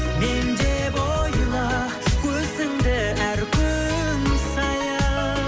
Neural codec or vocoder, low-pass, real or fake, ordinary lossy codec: none; none; real; none